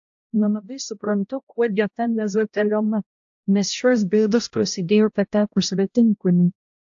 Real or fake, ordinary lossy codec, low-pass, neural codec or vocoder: fake; AAC, 64 kbps; 7.2 kHz; codec, 16 kHz, 0.5 kbps, X-Codec, HuBERT features, trained on balanced general audio